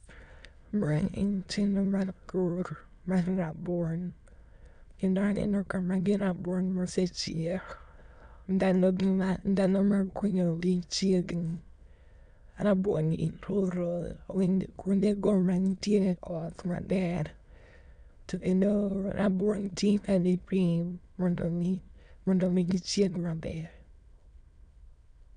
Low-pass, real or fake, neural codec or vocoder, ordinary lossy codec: 9.9 kHz; fake; autoencoder, 22.05 kHz, a latent of 192 numbers a frame, VITS, trained on many speakers; none